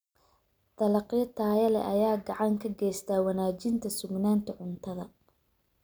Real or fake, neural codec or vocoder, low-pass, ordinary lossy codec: real; none; none; none